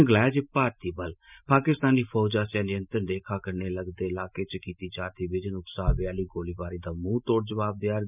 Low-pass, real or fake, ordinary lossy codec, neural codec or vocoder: 3.6 kHz; real; none; none